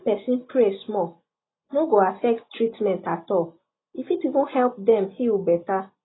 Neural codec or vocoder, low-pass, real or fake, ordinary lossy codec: none; 7.2 kHz; real; AAC, 16 kbps